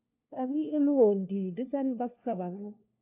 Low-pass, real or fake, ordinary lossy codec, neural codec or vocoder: 3.6 kHz; fake; AAC, 32 kbps; codec, 16 kHz, 1 kbps, FunCodec, trained on LibriTTS, 50 frames a second